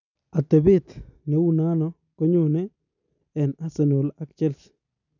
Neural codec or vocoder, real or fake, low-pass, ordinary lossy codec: none; real; 7.2 kHz; none